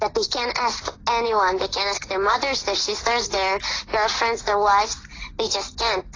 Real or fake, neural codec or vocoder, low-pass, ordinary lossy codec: real; none; 7.2 kHz; AAC, 32 kbps